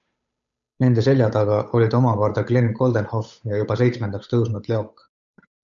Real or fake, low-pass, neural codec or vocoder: fake; 7.2 kHz; codec, 16 kHz, 8 kbps, FunCodec, trained on Chinese and English, 25 frames a second